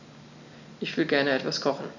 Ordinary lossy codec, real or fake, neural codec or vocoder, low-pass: none; real; none; 7.2 kHz